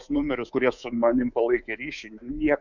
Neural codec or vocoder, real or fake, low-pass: codec, 24 kHz, 3.1 kbps, DualCodec; fake; 7.2 kHz